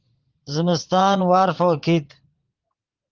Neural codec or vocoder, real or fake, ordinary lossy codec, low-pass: vocoder, 44.1 kHz, 80 mel bands, Vocos; fake; Opus, 16 kbps; 7.2 kHz